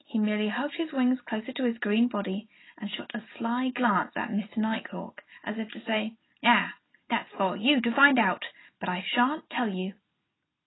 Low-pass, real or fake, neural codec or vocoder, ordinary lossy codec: 7.2 kHz; real; none; AAC, 16 kbps